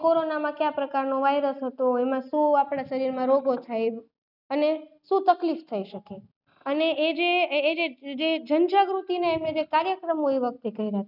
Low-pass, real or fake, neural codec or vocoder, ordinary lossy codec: 5.4 kHz; real; none; none